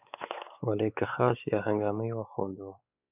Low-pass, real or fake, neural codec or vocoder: 3.6 kHz; fake; codec, 16 kHz, 4 kbps, X-Codec, WavLM features, trained on Multilingual LibriSpeech